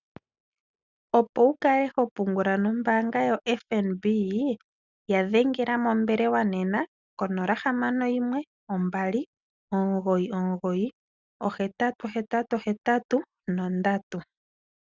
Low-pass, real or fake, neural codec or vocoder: 7.2 kHz; real; none